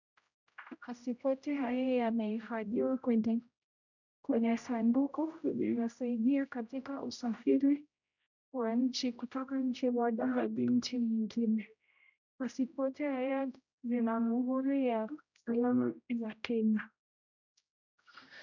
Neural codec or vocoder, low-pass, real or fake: codec, 16 kHz, 0.5 kbps, X-Codec, HuBERT features, trained on general audio; 7.2 kHz; fake